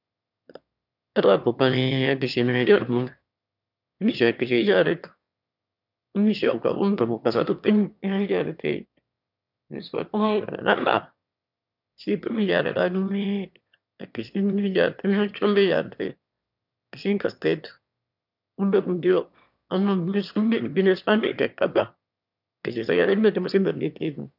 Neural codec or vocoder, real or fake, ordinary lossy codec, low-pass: autoencoder, 22.05 kHz, a latent of 192 numbers a frame, VITS, trained on one speaker; fake; none; 5.4 kHz